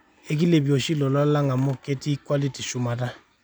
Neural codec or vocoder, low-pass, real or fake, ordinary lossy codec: none; none; real; none